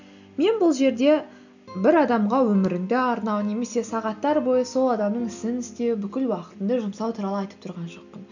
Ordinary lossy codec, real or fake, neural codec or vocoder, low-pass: none; real; none; 7.2 kHz